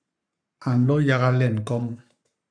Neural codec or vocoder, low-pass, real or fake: codec, 44.1 kHz, 7.8 kbps, Pupu-Codec; 9.9 kHz; fake